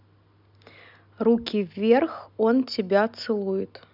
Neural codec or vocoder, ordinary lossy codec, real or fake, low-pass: none; none; real; 5.4 kHz